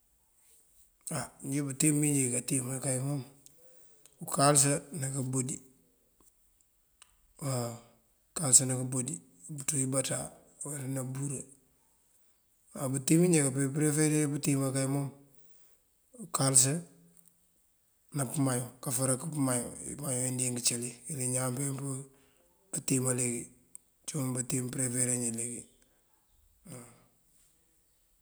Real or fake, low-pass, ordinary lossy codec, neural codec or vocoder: real; none; none; none